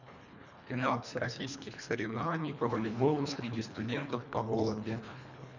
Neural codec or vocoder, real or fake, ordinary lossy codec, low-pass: codec, 24 kHz, 1.5 kbps, HILCodec; fake; none; 7.2 kHz